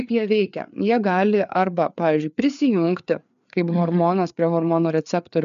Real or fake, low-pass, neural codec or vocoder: fake; 7.2 kHz; codec, 16 kHz, 4 kbps, FreqCodec, larger model